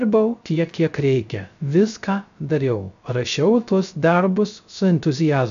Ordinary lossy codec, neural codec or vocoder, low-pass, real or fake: AAC, 96 kbps; codec, 16 kHz, 0.3 kbps, FocalCodec; 7.2 kHz; fake